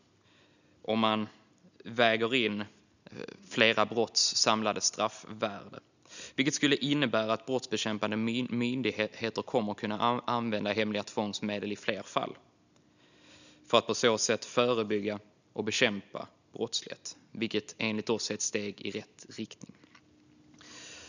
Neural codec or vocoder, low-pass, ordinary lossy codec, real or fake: none; 7.2 kHz; none; real